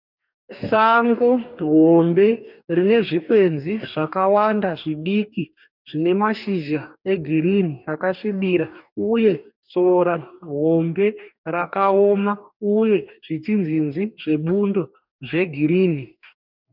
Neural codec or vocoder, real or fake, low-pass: codec, 44.1 kHz, 2.6 kbps, DAC; fake; 5.4 kHz